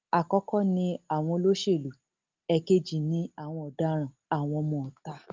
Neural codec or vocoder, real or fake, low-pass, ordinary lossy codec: none; real; 7.2 kHz; Opus, 24 kbps